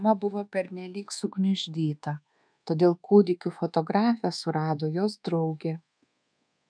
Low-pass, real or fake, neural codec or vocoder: 9.9 kHz; fake; codec, 24 kHz, 1.2 kbps, DualCodec